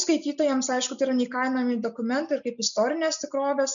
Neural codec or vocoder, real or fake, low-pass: none; real; 7.2 kHz